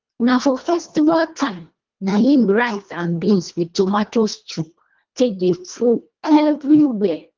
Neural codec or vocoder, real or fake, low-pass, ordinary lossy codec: codec, 24 kHz, 1.5 kbps, HILCodec; fake; 7.2 kHz; Opus, 32 kbps